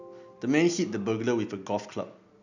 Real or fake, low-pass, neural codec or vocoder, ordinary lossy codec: real; 7.2 kHz; none; none